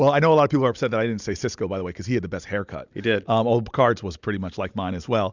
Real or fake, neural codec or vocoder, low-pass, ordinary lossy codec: real; none; 7.2 kHz; Opus, 64 kbps